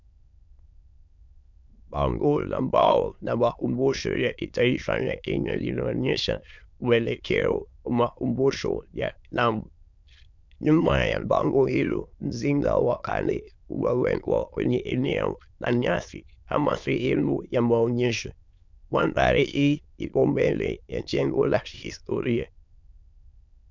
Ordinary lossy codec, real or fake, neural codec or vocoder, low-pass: MP3, 64 kbps; fake; autoencoder, 22.05 kHz, a latent of 192 numbers a frame, VITS, trained on many speakers; 7.2 kHz